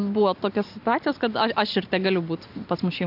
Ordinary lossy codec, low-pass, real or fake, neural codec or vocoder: AAC, 48 kbps; 5.4 kHz; real; none